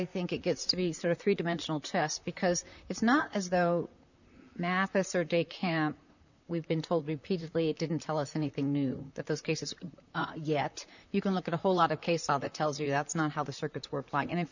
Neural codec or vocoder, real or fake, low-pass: vocoder, 44.1 kHz, 128 mel bands, Pupu-Vocoder; fake; 7.2 kHz